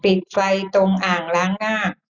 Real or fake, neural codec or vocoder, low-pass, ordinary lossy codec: real; none; 7.2 kHz; none